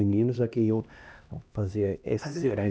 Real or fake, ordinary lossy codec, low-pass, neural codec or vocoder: fake; none; none; codec, 16 kHz, 1 kbps, X-Codec, HuBERT features, trained on LibriSpeech